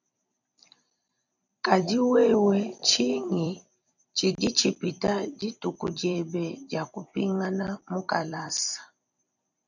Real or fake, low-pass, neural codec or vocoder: fake; 7.2 kHz; vocoder, 44.1 kHz, 128 mel bands every 256 samples, BigVGAN v2